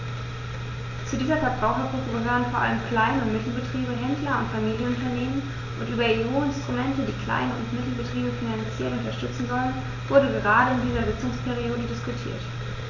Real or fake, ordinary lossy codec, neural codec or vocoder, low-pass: real; none; none; 7.2 kHz